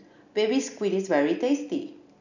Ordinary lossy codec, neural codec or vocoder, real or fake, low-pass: none; none; real; 7.2 kHz